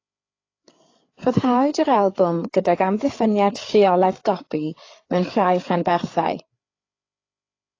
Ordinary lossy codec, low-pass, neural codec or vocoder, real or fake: AAC, 32 kbps; 7.2 kHz; codec, 16 kHz, 8 kbps, FreqCodec, larger model; fake